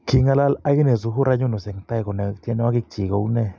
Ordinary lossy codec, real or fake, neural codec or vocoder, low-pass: none; real; none; none